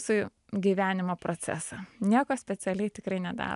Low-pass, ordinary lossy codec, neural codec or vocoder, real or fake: 10.8 kHz; MP3, 96 kbps; none; real